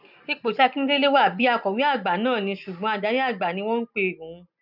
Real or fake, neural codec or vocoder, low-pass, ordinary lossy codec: real; none; 5.4 kHz; none